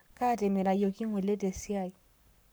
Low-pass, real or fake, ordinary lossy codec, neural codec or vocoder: none; fake; none; codec, 44.1 kHz, 7.8 kbps, Pupu-Codec